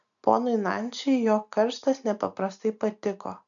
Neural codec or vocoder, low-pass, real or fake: none; 7.2 kHz; real